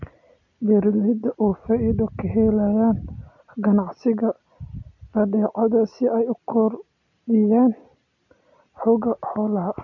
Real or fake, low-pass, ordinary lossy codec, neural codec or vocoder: real; 7.2 kHz; AAC, 48 kbps; none